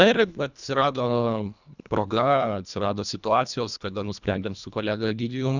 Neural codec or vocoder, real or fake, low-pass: codec, 24 kHz, 1.5 kbps, HILCodec; fake; 7.2 kHz